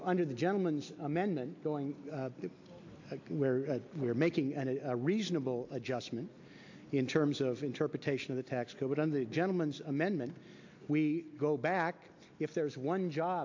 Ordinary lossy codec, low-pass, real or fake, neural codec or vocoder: AAC, 48 kbps; 7.2 kHz; real; none